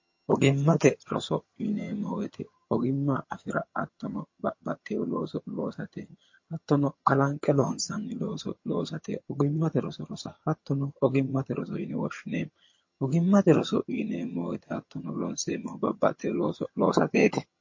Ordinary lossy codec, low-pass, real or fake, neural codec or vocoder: MP3, 32 kbps; 7.2 kHz; fake; vocoder, 22.05 kHz, 80 mel bands, HiFi-GAN